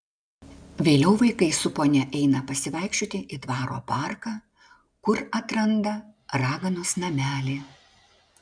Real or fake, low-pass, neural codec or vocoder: real; 9.9 kHz; none